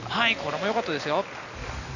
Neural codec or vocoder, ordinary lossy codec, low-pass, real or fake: none; none; 7.2 kHz; real